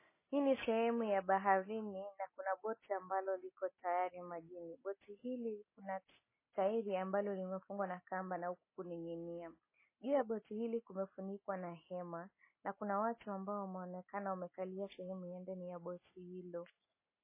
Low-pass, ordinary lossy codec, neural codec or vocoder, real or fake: 3.6 kHz; MP3, 16 kbps; none; real